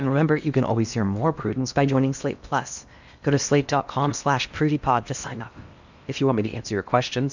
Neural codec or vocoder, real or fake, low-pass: codec, 16 kHz in and 24 kHz out, 0.8 kbps, FocalCodec, streaming, 65536 codes; fake; 7.2 kHz